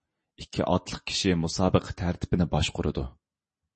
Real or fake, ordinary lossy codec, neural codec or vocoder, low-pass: real; MP3, 32 kbps; none; 9.9 kHz